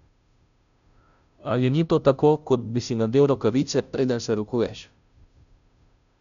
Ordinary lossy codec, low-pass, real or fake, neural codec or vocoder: none; 7.2 kHz; fake; codec, 16 kHz, 0.5 kbps, FunCodec, trained on Chinese and English, 25 frames a second